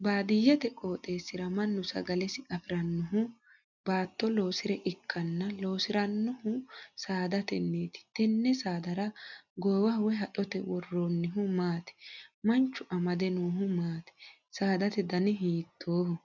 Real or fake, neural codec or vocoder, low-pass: real; none; 7.2 kHz